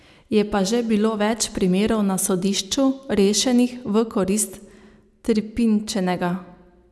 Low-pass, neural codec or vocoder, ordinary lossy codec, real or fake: none; none; none; real